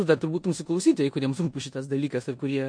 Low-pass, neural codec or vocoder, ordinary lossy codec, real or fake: 9.9 kHz; codec, 16 kHz in and 24 kHz out, 0.9 kbps, LongCat-Audio-Codec, four codebook decoder; MP3, 48 kbps; fake